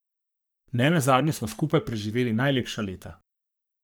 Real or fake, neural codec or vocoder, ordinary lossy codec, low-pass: fake; codec, 44.1 kHz, 3.4 kbps, Pupu-Codec; none; none